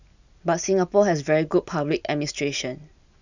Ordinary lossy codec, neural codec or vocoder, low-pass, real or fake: none; none; 7.2 kHz; real